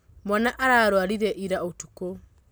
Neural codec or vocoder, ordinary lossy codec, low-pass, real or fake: none; none; none; real